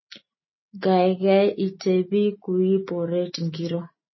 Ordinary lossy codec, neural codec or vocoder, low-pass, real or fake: MP3, 24 kbps; none; 7.2 kHz; real